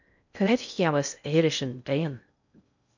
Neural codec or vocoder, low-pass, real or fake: codec, 16 kHz in and 24 kHz out, 0.6 kbps, FocalCodec, streaming, 2048 codes; 7.2 kHz; fake